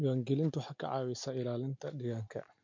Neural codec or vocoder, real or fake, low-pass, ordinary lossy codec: none; real; 7.2 kHz; MP3, 48 kbps